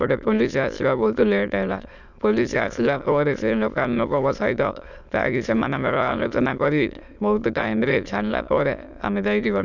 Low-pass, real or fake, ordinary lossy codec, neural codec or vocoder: 7.2 kHz; fake; none; autoencoder, 22.05 kHz, a latent of 192 numbers a frame, VITS, trained on many speakers